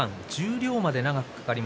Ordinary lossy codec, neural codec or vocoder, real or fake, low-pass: none; none; real; none